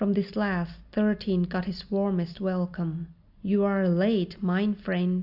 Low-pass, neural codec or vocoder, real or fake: 5.4 kHz; none; real